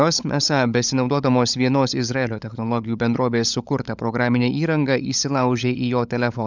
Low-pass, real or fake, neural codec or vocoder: 7.2 kHz; fake; codec, 16 kHz, 16 kbps, FunCodec, trained on LibriTTS, 50 frames a second